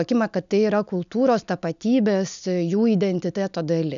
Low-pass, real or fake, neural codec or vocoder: 7.2 kHz; real; none